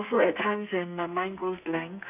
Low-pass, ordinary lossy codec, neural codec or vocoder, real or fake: 3.6 kHz; none; codec, 32 kHz, 1.9 kbps, SNAC; fake